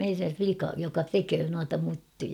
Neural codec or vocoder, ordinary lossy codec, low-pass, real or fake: none; none; 19.8 kHz; real